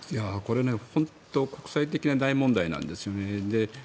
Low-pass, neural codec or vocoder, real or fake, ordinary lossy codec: none; none; real; none